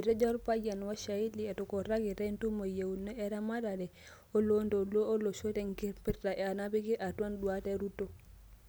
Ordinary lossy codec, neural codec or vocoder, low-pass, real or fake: none; none; none; real